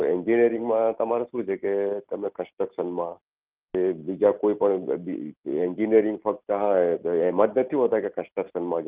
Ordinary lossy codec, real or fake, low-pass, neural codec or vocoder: Opus, 32 kbps; real; 3.6 kHz; none